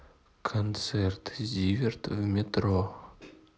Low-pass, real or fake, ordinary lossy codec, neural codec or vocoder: none; real; none; none